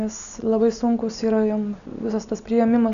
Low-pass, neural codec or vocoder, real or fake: 7.2 kHz; none; real